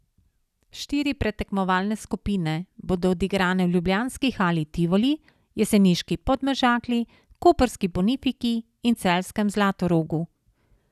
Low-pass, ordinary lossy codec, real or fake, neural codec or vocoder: 14.4 kHz; none; real; none